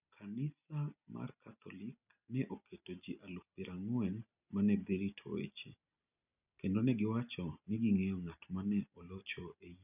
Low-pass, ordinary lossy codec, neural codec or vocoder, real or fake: 3.6 kHz; none; none; real